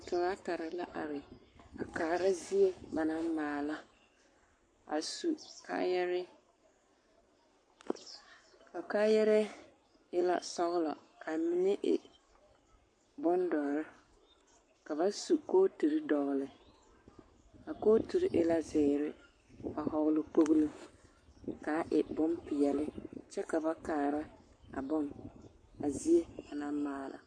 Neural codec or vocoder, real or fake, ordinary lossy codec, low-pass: codec, 44.1 kHz, 7.8 kbps, Pupu-Codec; fake; MP3, 48 kbps; 9.9 kHz